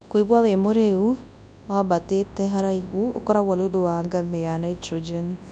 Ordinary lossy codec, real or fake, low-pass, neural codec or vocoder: none; fake; 10.8 kHz; codec, 24 kHz, 0.9 kbps, WavTokenizer, large speech release